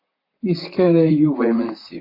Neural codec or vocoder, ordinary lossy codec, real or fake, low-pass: vocoder, 44.1 kHz, 128 mel bands, Pupu-Vocoder; AAC, 32 kbps; fake; 5.4 kHz